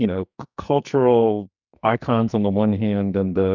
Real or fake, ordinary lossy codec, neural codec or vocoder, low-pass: fake; Opus, 64 kbps; codec, 44.1 kHz, 2.6 kbps, SNAC; 7.2 kHz